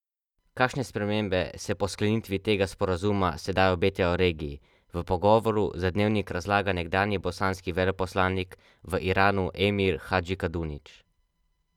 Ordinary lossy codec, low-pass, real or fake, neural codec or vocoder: none; 19.8 kHz; real; none